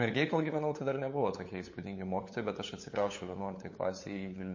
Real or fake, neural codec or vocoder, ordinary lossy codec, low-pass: fake; codec, 16 kHz, 8 kbps, FunCodec, trained on LibriTTS, 25 frames a second; MP3, 32 kbps; 7.2 kHz